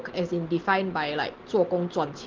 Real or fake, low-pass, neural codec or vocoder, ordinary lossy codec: real; 7.2 kHz; none; Opus, 16 kbps